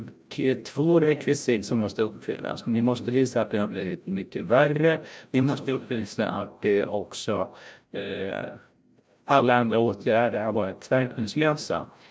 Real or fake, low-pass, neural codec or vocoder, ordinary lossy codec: fake; none; codec, 16 kHz, 0.5 kbps, FreqCodec, larger model; none